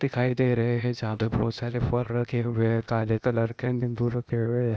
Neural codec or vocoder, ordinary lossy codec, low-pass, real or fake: codec, 16 kHz, 0.8 kbps, ZipCodec; none; none; fake